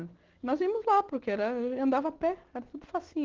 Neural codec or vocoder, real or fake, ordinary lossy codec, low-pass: none; real; Opus, 16 kbps; 7.2 kHz